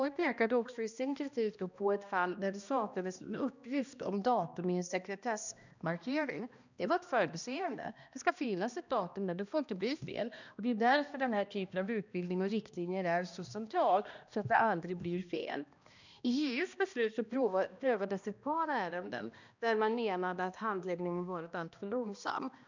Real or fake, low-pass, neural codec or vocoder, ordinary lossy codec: fake; 7.2 kHz; codec, 16 kHz, 1 kbps, X-Codec, HuBERT features, trained on balanced general audio; none